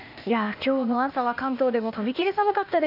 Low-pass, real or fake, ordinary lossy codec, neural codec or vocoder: 5.4 kHz; fake; none; codec, 16 kHz, 0.8 kbps, ZipCodec